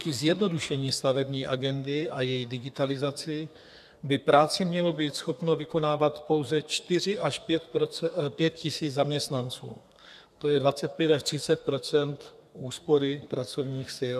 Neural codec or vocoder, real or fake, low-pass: codec, 32 kHz, 1.9 kbps, SNAC; fake; 14.4 kHz